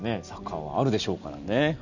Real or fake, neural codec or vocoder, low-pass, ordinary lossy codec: real; none; 7.2 kHz; none